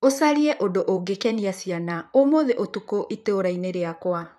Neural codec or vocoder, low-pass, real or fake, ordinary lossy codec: vocoder, 44.1 kHz, 128 mel bands, Pupu-Vocoder; 14.4 kHz; fake; none